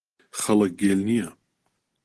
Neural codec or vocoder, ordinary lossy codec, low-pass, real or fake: none; Opus, 16 kbps; 10.8 kHz; real